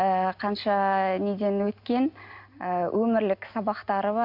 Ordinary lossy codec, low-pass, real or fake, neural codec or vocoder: MP3, 48 kbps; 5.4 kHz; real; none